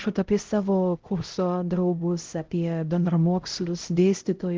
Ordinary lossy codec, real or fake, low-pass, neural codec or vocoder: Opus, 16 kbps; fake; 7.2 kHz; codec, 16 kHz, 0.5 kbps, X-Codec, HuBERT features, trained on LibriSpeech